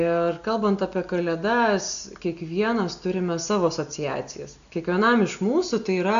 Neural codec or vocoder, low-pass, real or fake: none; 7.2 kHz; real